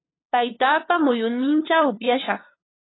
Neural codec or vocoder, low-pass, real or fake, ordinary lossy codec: codec, 16 kHz, 2 kbps, FunCodec, trained on LibriTTS, 25 frames a second; 7.2 kHz; fake; AAC, 16 kbps